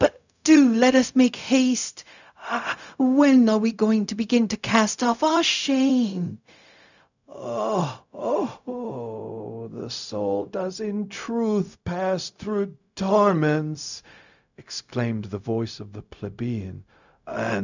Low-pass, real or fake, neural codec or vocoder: 7.2 kHz; fake; codec, 16 kHz, 0.4 kbps, LongCat-Audio-Codec